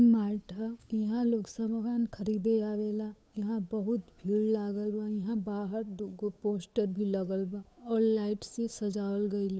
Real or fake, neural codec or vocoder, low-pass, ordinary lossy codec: fake; codec, 16 kHz, 8 kbps, FunCodec, trained on Chinese and English, 25 frames a second; none; none